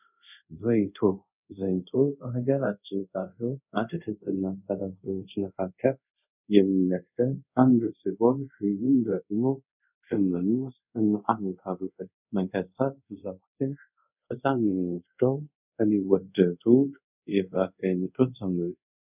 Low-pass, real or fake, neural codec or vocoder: 3.6 kHz; fake; codec, 24 kHz, 0.5 kbps, DualCodec